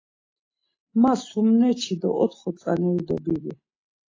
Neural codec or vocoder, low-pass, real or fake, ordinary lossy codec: none; 7.2 kHz; real; AAC, 32 kbps